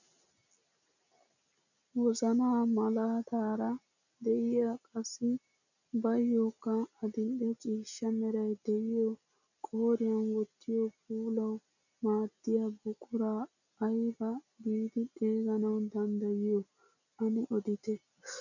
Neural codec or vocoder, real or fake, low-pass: none; real; 7.2 kHz